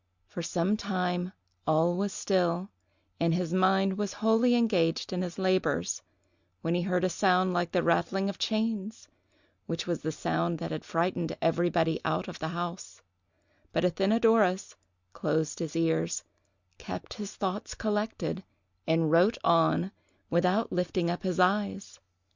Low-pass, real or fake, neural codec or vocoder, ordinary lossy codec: 7.2 kHz; real; none; Opus, 64 kbps